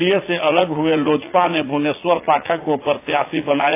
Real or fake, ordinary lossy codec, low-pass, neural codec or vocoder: fake; AAC, 24 kbps; 3.6 kHz; vocoder, 44.1 kHz, 80 mel bands, Vocos